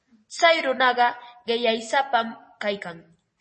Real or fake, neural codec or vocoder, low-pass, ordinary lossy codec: real; none; 9.9 kHz; MP3, 32 kbps